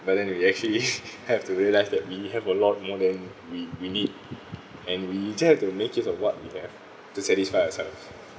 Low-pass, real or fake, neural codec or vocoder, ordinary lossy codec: none; real; none; none